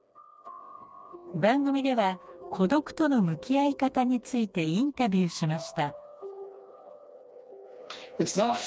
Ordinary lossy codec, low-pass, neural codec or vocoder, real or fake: none; none; codec, 16 kHz, 2 kbps, FreqCodec, smaller model; fake